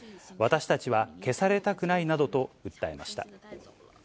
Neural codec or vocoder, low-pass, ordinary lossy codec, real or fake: none; none; none; real